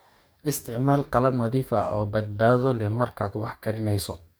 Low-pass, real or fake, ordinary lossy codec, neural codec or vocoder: none; fake; none; codec, 44.1 kHz, 2.6 kbps, DAC